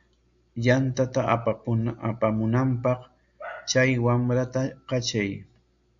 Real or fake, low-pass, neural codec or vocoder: real; 7.2 kHz; none